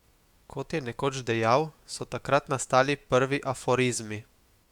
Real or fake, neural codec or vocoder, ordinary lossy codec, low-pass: fake; autoencoder, 48 kHz, 128 numbers a frame, DAC-VAE, trained on Japanese speech; Opus, 64 kbps; 19.8 kHz